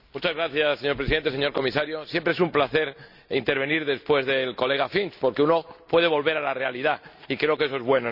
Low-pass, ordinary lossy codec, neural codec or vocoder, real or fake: 5.4 kHz; none; none; real